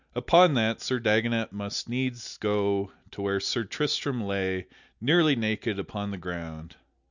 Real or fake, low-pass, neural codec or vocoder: real; 7.2 kHz; none